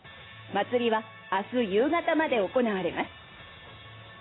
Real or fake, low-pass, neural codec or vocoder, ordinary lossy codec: real; 7.2 kHz; none; AAC, 16 kbps